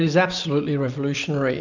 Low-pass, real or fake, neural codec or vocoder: 7.2 kHz; real; none